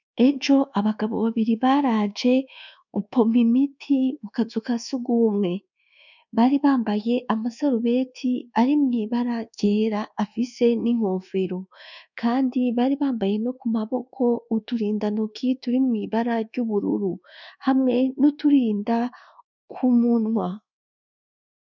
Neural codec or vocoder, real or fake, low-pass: codec, 24 kHz, 1.2 kbps, DualCodec; fake; 7.2 kHz